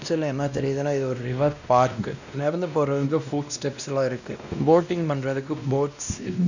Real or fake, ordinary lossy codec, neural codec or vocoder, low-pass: fake; none; codec, 16 kHz, 1 kbps, X-Codec, WavLM features, trained on Multilingual LibriSpeech; 7.2 kHz